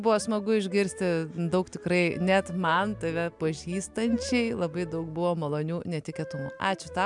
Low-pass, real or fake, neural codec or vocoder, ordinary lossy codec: 10.8 kHz; real; none; MP3, 96 kbps